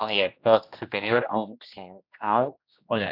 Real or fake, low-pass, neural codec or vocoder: fake; 5.4 kHz; codec, 16 kHz, 1 kbps, X-Codec, HuBERT features, trained on general audio